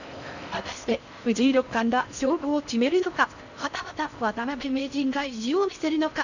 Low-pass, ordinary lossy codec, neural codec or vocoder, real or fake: 7.2 kHz; none; codec, 16 kHz in and 24 kHz out, 0.6 kbps, FocalCodec, streaming, 4096 codes; fake